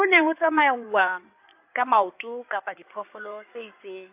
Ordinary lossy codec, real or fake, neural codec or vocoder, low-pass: AAC, 32 kbps; fake; codec, 16 kHz in and 24 kHz out, 2.2 kbps, FireRedTTS-2 codec; 3.6 kHz